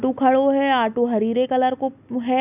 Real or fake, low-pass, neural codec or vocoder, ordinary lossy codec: real; 3.6 kHz; none; none